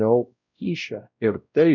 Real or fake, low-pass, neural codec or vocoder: fake; 7.2 kHz; codec, 16 kHz, 0.5 kbps, X-Codec, WavLM features, trained on Multilingual LibriSpeech